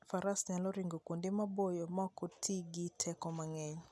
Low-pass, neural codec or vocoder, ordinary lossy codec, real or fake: none; none; none; real